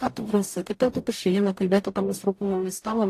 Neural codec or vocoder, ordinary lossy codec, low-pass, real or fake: codec, 44.1 kHz, 0.9 kbps, DAC; AAC, 64 kbps; 14.4 kHz; fake